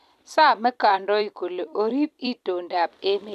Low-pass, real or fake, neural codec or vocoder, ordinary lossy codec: 14.4 kHz; fake; vocoder, 44.1 kHz, 128 mel bands every 256 samples, BigVGAN v2; AAC, 96 kbps